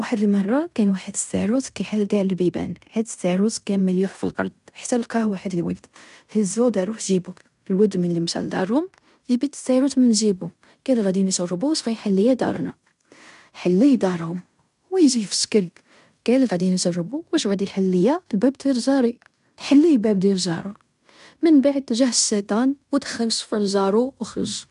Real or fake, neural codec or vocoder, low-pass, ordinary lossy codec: fake; codec, 16 kHz in and 24 kHz out, 0.9 kbps, LongCat-Audio-Codec, fine tuned four codebook decoder; 10.8 kHz; none